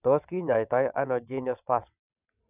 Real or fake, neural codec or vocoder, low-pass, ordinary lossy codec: fake; vocoder, 22.05 kHz, 80 mel bands, WaveNeXt; 3.6 kHz; none